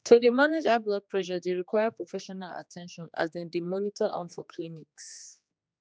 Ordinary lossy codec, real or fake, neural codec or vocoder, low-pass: none; fake; codec, 16 kHz, 2 kbps, X-Codec, HuBERT features, trained on general audio; none